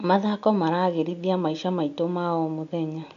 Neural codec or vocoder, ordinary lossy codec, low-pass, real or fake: none; MP3, 64 kbps; 7.2 kHz; real